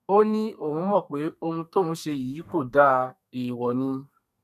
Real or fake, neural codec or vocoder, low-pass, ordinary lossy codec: fake; codec, 32 kHz, 1.9 kbps, SNAC; 14.4 kHz; none